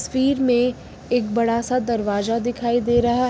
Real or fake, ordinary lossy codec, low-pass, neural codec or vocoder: real; none; none; none